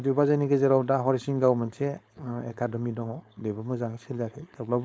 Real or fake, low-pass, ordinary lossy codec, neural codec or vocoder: fake; none; none; codec, 16 kHz, 4.8 kbps, FACodec